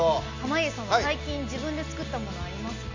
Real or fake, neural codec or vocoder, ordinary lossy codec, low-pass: real; none; MP3, 64 kbps; 7.2 kHz